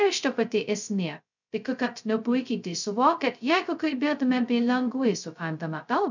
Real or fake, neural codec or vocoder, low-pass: fake; codec, 16 kHz, 0.2 kbps, FocalCodec; 7.2 kHz